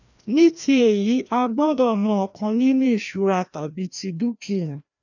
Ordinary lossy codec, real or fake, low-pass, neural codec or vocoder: none; fake; 7.2 kHz; codec, 16 kHz, 1 kbps, FreqCodec, larger model